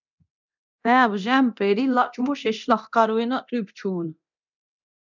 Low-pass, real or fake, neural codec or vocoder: 7.2 kHz; fake; codec, 24 kHz, 0.9 kbps, DualCodec